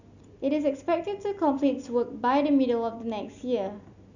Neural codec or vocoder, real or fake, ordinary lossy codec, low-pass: none; real; none; 7.2 kHz